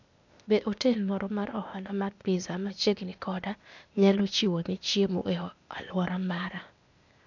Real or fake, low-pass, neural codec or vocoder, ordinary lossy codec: fake; 7.2 kHz; codec, 16 kHz, 0.8 kbps, ZipCodec; none